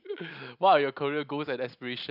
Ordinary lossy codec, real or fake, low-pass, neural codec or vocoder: none; real; 5.4 kHz; none